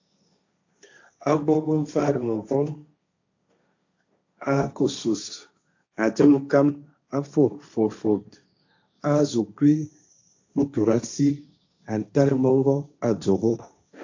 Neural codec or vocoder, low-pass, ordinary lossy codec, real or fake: codec, 16 kHz, 1.1 kbps, Voila-Tokenizer; 7.2 kHz; AAC, 48 kbps; fake